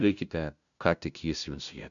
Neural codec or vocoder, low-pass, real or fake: codec, 16 kHz, 0.5 kbps, FunCodec, trained on LibriTTS, 25 frames a second; 7.2 kHz; fake